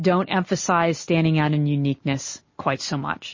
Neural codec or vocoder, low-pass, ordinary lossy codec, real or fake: none; 7.2 kHz; MP3, 32 kbps; real